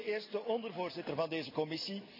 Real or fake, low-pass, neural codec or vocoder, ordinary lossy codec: real; 5.4 kHz; none; none